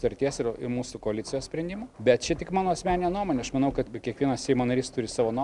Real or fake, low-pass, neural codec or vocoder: real; 10.8 kHz; none